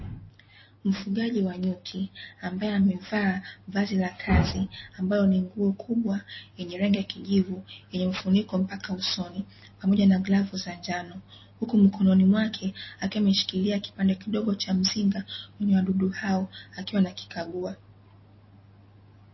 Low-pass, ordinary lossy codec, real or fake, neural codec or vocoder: 7.2 kHz; MP3, 24 kbps; real; none